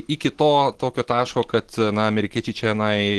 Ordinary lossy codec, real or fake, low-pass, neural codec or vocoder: Opus, 16 kbps; real; 10.8 kHz; none